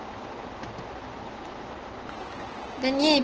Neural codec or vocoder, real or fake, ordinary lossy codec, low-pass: none; real; Opus, 16 kbps; 7.2 kHz